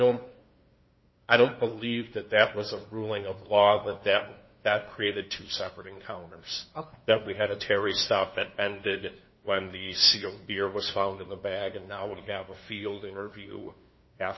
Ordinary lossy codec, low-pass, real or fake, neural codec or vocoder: MP3, 24 kbps; 7.2 kHz; fake; codec, 16 kHz, 2 kbps, FunCodec, trained on LibriTTS, 25 frames a second